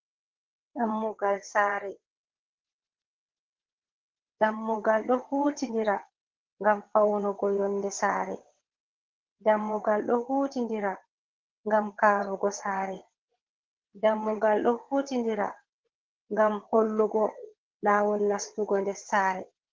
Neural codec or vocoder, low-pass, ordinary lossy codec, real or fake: vocoder, 22.05 kHz, 80 mel bands, WaveNeXt; 7.2 kHz; Opus, 16 kbps; fake